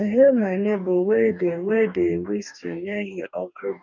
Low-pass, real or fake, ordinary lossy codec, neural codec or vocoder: 7.2 kHz; fake; none; codec, 44.1 kHz, 2.6 kbps, DAC